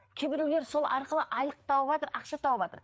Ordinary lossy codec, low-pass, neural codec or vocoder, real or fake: none; none; codec, 16 kHz, 4 kbps, FreqCodec, larger model; fake